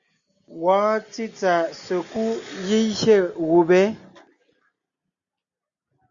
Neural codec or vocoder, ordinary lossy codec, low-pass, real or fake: none; Opus, 64 kbps; 7.2 kHz; real